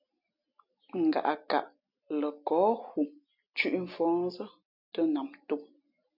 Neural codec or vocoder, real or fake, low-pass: none; real; 5.4 kHz